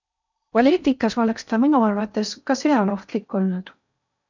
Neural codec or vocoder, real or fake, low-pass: codec, 16 kHz in and 24 kHz out, 0.6 kbps, FocalCodec, streaming, 4096 codes; fake; 7.2 kHz